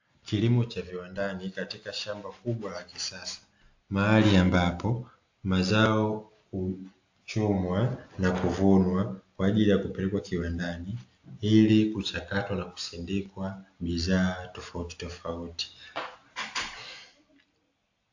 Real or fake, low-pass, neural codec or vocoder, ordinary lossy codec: real; 7.2 kHz; none; AAC, 48 kbps